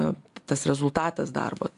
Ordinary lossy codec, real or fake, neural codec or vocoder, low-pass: AAC, 64 kbps; real; none; 10.8 kHz